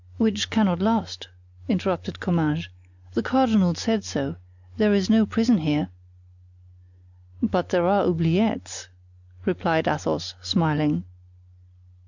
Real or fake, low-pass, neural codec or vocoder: real; 7.2 kHz; none